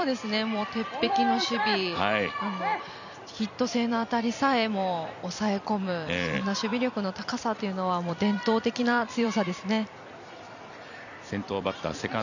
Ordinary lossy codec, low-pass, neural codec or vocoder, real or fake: none; 7.2 kHz; vocoder, 44.1 kHz, 128 mel bands every 256 samples, BigVGAN v2; fake